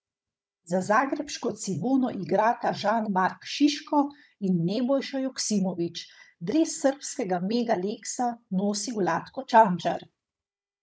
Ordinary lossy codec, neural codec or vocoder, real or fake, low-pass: none; codec, 16 kHz, 16 kbps, FunCodec, trained on Chinese and English, 50 frames a second; fake; none